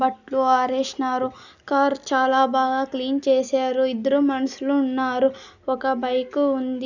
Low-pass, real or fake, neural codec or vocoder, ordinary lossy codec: 7.2 kHz; real; none; none